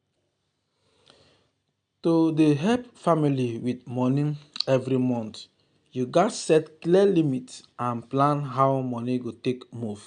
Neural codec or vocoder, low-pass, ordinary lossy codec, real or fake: none; 9.9 kHz; none; real